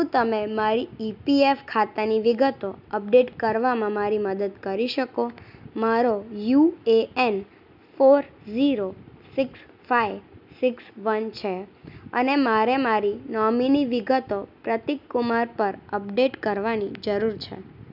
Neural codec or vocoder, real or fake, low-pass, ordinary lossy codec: none; real; 5.4 kHz; none